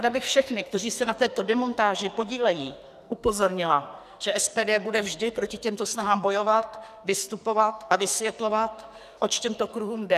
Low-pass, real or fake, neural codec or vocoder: 14.4 kHz; fake; codec, 44.1 kHz, 2.6 kbps, SNAC